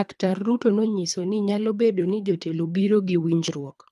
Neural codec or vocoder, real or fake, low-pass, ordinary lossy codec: codec, 24 kHz, 3 kbps, HILCodec; fake; none; none